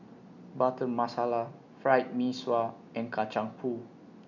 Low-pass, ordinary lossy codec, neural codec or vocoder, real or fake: 7.2 kHz; none; none; real